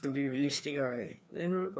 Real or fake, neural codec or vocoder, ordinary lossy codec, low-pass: fake; codec, 16 kHz, 2 kbps, FreqCodec, larger model; none; none